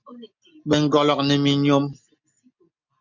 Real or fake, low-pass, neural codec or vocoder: real; 7.2 kHz; none